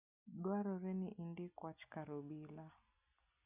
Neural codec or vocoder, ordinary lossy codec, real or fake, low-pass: none; none; real; 3.6 kHz